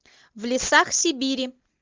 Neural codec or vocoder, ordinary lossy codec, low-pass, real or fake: none; Opus, 24 kbps; 7.2 kHz; real